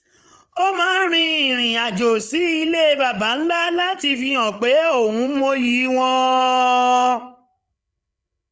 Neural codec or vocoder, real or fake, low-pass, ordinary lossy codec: codec, 16 kHz, 8 kbps, FreqCodec, larger model; fake; none; none